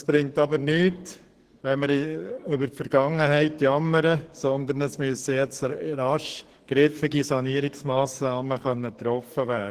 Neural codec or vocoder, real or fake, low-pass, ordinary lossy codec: codec, 44.1 kHz, 2.6 kbps, SNAC; fake; 14.4 kHz; Opus, 16 kbps